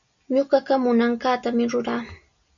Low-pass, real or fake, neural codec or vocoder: 7.2 kHz; real; none